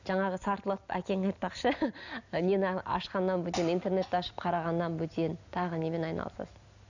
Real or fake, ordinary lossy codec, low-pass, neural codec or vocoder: real; none; 7.2 kHz; none